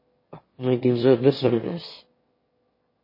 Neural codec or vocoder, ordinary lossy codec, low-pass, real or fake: autoencoder, 22.05 kHz, a latent of 192 numbers a frame, VITS, trained on one speaker; MP3, 24 kbps; 5.4 kHz; fake